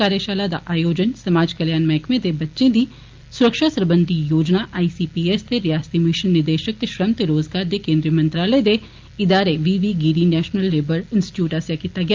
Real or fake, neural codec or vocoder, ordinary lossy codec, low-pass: real; none; Opus, 32 kbps; 7.2 kHz